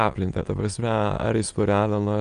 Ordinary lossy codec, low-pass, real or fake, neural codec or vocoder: Opus, 32 kbps; 9.9 kHz; fake; autoencoder, 22.05 kHz, a latent of 192 numbers a frame, VITS, trained on many speakers